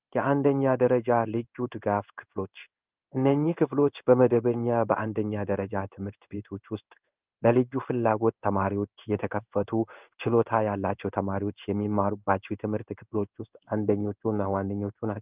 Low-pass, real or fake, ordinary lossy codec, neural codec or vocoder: 3.6 kHz; fake; Opus, 24 kbps; codec, 16 kHz in and 24 kHz out, 1 kbps, XY-Tokenizer